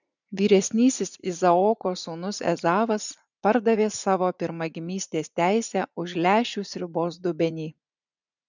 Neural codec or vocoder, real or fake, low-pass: vocoder, 44.1 kHz, 80 mel bands, Vocos; fake; 7.2 kHz